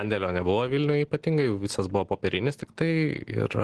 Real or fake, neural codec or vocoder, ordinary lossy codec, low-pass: real; none; Opus, 16 kbps; 10.8 kHz